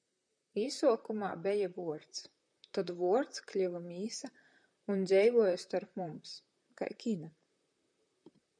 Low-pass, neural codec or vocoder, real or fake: 9.9 kHz; vocoder, 44.1 kHz, 128 mel bands, Pupu-Vocoder; fake